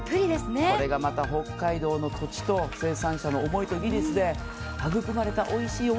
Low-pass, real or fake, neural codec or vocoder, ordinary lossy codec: none; real; none; none